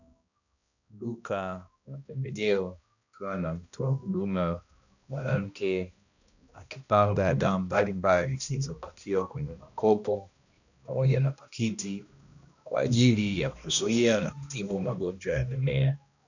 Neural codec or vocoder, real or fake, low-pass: codec, 16 kHz, 1 kbps, X-Codec, HuBERT features, trained on balanced general audio; fake; 7.2 kHz